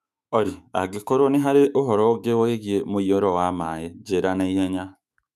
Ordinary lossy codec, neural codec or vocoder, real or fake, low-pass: none; autoencoder, 48 kHz, 128 numbers a frame, DAC-VAE, trained on Japanese speech; fake; 14.4 kHz